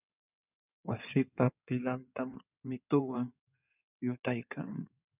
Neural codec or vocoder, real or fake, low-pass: codec, 16 kHz in and 24 kHz out, 2.2 kbps, FireRedTTS-2 codec; fake; 3.6 kHz